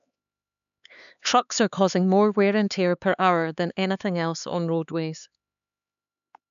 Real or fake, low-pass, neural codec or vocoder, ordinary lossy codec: fake; 7.2 kHz; codec, 16 kHz, 4 kbps, X-Codec, HuBERT features, trained on LibriSpeech; none